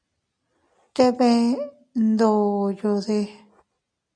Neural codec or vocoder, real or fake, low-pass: none; real; 9.9 kHz